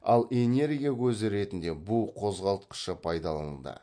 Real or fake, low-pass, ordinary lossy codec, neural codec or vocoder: real; 9.9 kHz; MP3, 48 kbps; none